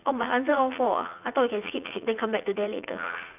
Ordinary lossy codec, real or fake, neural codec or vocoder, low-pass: none; fake; vocoder, 44.1 kHz, 80 mel bands, Vocos; 3.6 kHz